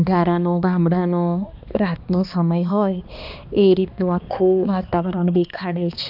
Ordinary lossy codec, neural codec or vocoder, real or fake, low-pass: none; codec, 16 kHz, 2 kbps, X-Codec, HuBERT features, trained on balanced general audio; fake; 5.4 kHz